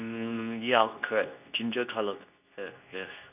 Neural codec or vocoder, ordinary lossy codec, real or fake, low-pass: codec, 24 kHz, 0.9 kbps, WavTokenizer, medium speech release version 2; none; fake; 3.6 kHz